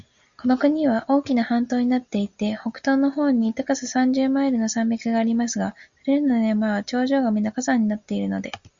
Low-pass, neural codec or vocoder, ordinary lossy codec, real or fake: 7.2 kHz; none; Opus, 64 kbps; real